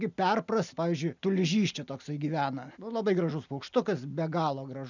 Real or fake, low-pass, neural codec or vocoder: real; 7.2 kHz; none